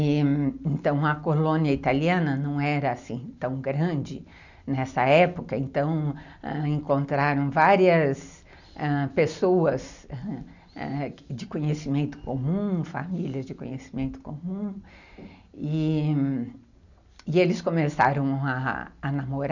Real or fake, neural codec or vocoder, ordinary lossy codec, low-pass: real; none; none; 7.2 kHz